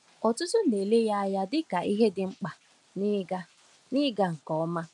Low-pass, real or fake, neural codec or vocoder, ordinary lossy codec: 10.8 kHz; real; none; MP3, 96 kbps